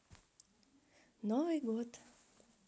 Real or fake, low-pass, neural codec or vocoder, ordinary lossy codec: real; none; none; none